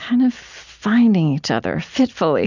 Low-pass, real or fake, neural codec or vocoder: 7.2 kHz; real; none